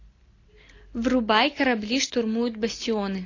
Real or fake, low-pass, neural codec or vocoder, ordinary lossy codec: real; 7.2 kHz; none; AAC, 32 kbps